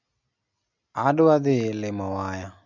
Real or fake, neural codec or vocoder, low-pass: real; none; 7.2 kHz